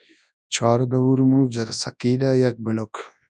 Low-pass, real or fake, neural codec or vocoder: 10.8 kHz; fake; codec, 24 kHz, 0.9 kbps, WavTokenizer, large speech release